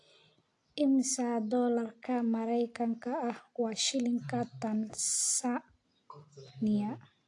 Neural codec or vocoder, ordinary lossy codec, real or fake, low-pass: none; AAC, 48 kbps; real; 10.8 kHz